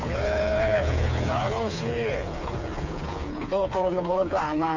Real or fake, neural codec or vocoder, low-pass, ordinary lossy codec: fake; codec, 16 kHz, 4 kbps, FreqCodec, smaller model; 7.2 kHz; none